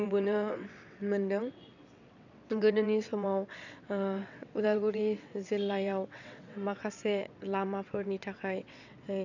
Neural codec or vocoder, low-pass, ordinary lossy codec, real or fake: vocoder, 22.05 kHz, 80 mel bands, WaveNeXt; 7.2 kHz; none; fake